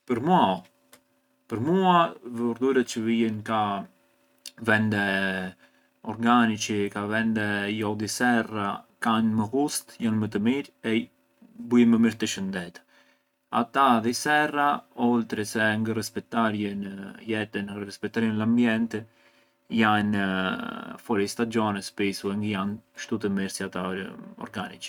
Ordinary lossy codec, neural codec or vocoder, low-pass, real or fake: none; none; 19.8 kHz; real